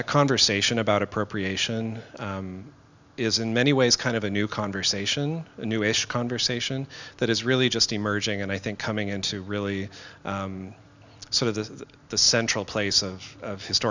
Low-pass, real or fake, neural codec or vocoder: 7.2 kHz; real; none